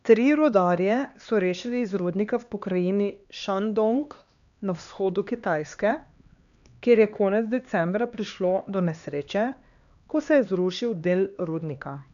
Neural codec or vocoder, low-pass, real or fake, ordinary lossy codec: codec, 16 kHz, 2 kbps, X-Codec, HuBERT features, trained on LibriSpeech; 7.2 kHz; fake; none